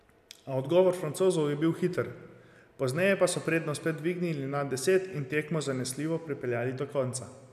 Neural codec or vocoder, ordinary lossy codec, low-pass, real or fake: none; none; 14.4 kHz; real